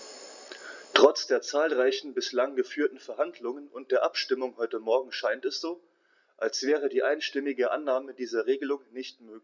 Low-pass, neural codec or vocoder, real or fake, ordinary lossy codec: 7.2 kHz; none; real; none